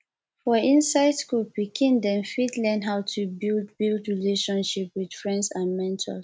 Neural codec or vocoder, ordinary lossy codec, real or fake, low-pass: none; none; real; none